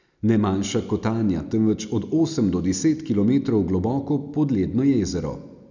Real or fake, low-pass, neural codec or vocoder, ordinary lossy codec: real; 7.2 kHz; none; none